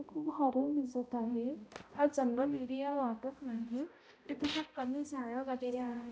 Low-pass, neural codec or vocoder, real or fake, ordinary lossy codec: none; codec, 16 kHz, 0.5 kbps, X-Codec, HuBERT features, trained on balanced general audio; fake; none